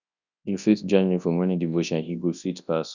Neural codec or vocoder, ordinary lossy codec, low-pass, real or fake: codec, 24 kHz, 0.9 kbps, WavTokenizer, large speech release; none; 7.2 kHz; fake